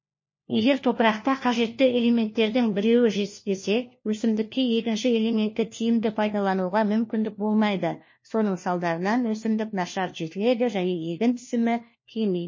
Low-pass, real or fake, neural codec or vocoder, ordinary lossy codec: 7.2 kHz; fake; codec, 16 kHz, 1 kbps, FunCodec, trained on LibriTTS, 50 frames a second; MP3, 32 kbps